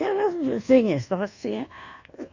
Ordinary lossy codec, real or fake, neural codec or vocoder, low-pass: none; fake; codec, 24 kHz, 1.2 kbps, DualCodec; 7.2 kHz